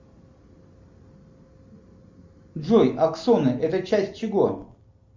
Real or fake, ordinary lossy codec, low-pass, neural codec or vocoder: real; MP3, 64 kbps; 7.2 kHz; none